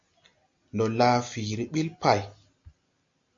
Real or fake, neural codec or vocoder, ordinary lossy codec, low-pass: real; none; MP3, 48 kbps; 7.2 kHz